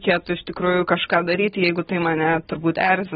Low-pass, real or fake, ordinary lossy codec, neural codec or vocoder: 19.8 kHz; real; AAC, 16 kbps; none